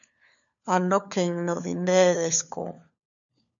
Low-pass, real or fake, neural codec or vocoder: 7.2 kHz; fake; codec, 16 kHz, 4 kbps, FunCodec, trained on LibriTTS, 50 frames a second